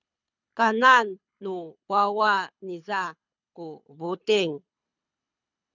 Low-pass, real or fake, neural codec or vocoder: 7.2 kHz; fake; codec, 24 kHz, 6 kbps, HILCodec